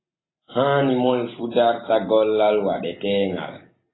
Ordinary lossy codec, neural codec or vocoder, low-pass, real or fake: AAC, 16 kbps; none; 7.2 kHz; real